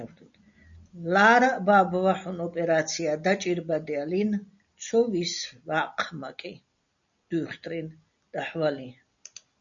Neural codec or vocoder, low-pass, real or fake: none; 7.2 kHz; real